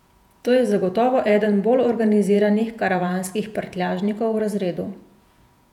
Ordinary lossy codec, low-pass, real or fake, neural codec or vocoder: none; 19.8 kHz; fake; vocoder, 48 kHz, 128 mel bands, Vocos